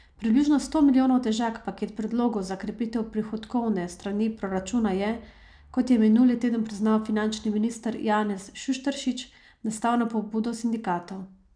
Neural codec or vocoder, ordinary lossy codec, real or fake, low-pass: none; none; real; 9.9 kHz